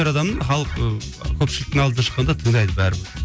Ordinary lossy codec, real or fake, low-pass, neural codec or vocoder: none; real; none; none